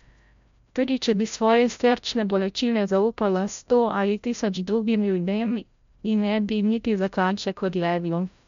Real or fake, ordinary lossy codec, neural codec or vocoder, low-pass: fake; MP3, 64 kbps; codec, 16 kHz, 0.5 kbps, FreqCodec, larger model; 7.2 kHz